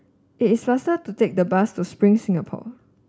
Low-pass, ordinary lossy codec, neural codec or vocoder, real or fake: none; none; none; real